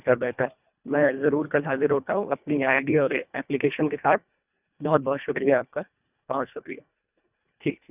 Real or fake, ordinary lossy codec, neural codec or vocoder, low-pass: fake; none; codec, 24 kHz, 1.5 kbps, HILCodec; 3.6 kHz